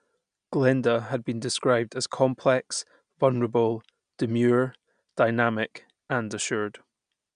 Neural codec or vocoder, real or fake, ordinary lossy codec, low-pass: none; real; MP3, 96 kbps; 9.9 kHz